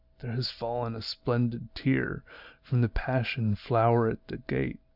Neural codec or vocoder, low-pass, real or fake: none; 5.4 kHz; real